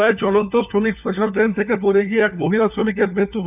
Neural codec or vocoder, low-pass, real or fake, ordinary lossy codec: codec, 16 kHz, 4 kbps, FunCodec, trained on LibriTTS, 50 frames a second; 3.6 kHz; fake; none